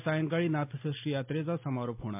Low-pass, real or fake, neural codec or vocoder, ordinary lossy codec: 3.6 kHz; real; none; MP3, 32 kbps